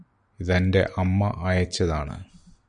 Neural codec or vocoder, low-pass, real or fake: none; 9.9 kHz; real